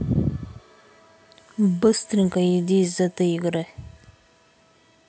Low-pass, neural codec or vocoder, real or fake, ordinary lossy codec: none; none; real; none